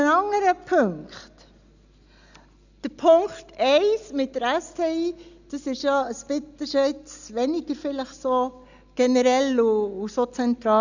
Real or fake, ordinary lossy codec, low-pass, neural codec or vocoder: real; none; 7.2 kHz; none